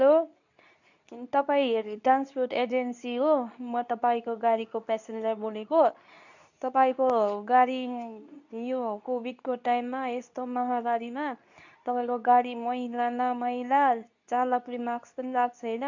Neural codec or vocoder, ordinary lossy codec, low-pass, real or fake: codec, 24 kHz, 0.9 kbps, WavTokenizer, medium speech release version 2; none; 7.2 kHz; fake